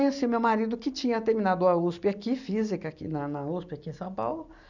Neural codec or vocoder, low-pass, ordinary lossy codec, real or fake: none; 7.2 kHz; none; real